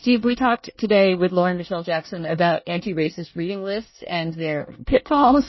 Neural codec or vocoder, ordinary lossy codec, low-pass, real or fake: codec, 24 kHz, 1 kbps, SNAC; MP3, 24 kbps; 7.2 kHz; fake